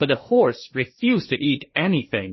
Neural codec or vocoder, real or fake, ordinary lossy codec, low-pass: codec, 16 kHz, 1.1 kbps, Voila-Tokenizer; fake; MP3, 24 kbps; 7.2 kHz